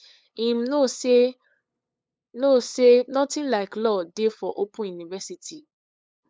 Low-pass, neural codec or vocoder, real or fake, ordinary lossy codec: none; codec, 16 kHz, 8 kbps, FunCodec, trained on LibriTTS, 25 frames a second; fake; none